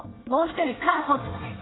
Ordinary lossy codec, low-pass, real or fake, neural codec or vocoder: AAC, 16 kbps; 7.2 kHz; fake; codec, 24 kHz, 1 kbps, SNAC